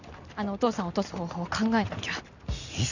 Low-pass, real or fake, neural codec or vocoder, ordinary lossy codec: 7.2 kHz; real; none; none